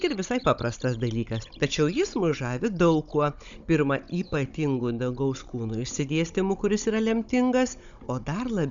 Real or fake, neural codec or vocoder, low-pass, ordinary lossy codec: fake; codec, 16 kHz, 16 kbps, FunCodec, trained on Chinese and English, 50 frames a second; 7.2 kHz; Opus, 64 kbps